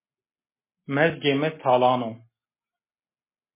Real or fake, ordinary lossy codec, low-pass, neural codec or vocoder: real; MP3, 16 kbps; 3.6 kHz; none